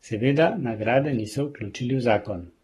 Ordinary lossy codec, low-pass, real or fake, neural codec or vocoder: AAC, 32 kbps; 19.8 kHz; fake; codec, 44.1 kHz, 7.8 kbps, Pupu-Codec